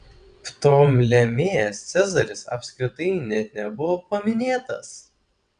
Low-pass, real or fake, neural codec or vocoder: 9.9 kHz; fake; vocoder, 22.05 kHz, 80 mel bands, WaveNeXt